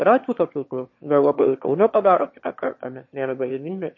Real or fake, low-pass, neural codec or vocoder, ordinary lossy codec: fake; 7.2 kHz; autoencoder, 22.05 kHz, a latent of 192 numbers a frame, VITS, trained on one speaker; MP3, 32 kbps